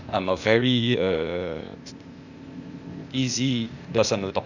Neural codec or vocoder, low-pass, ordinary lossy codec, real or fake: codec, 16 kHz, 0.8 kbps, ZipCodec; 7.2 kHz; none; fake